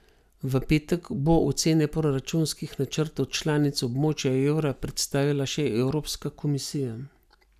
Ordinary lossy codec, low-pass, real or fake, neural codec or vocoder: none; 14.4 kHz; real; none